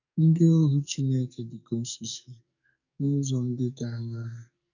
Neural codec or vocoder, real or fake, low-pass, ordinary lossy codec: codec, 44.1 kHz, 2.6 kbps, SNAC; fake; 7.2 kHz; none